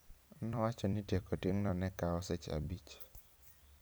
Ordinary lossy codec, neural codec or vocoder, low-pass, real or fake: none; vocoder, 44.1 kHz, 128 mel bands every 256 samples, BigVGAN v2; none; fake